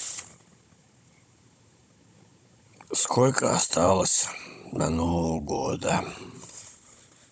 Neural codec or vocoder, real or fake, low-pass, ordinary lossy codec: codec, 16 kHz, 16 kbps, FunCodec, trained on Chinese and English, 50 frames a second; fake; none; none